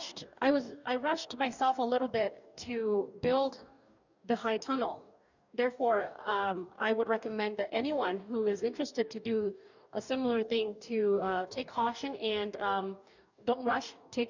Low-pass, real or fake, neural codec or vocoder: 7.2 kHz; fake; codec, 44.1 kHz, 2.6 kbps, DAC